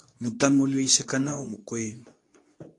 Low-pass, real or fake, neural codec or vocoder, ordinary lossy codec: 10.8 kHz; fake; codec, 24 kHz, 0.9 kbps, WavTokenizer, medium speech release version 2; AAC, 48 kbps